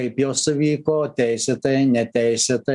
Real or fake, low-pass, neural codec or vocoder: real; 10.8 kHz; none